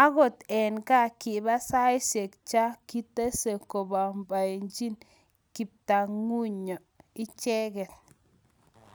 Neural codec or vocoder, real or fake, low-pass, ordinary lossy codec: none; real; none; none